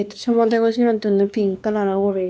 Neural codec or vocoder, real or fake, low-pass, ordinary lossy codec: codec, 16 kHz, 2 kbps, X-Codec, WavLM features, trained on Multilingual LibriSpeech; fake; none; none